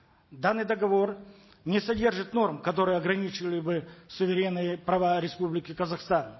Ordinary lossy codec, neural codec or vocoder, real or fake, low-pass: MP3, 24 kbps; none; real; 7.2 kHz